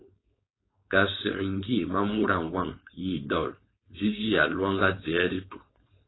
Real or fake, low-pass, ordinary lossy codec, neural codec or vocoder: fake; 7.2 kHz; AAC, 16 kbps; codec, 16 kHz, 4.8 kbps, FACodec